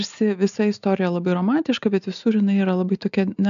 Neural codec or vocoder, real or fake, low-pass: none; real; 7.2 kHz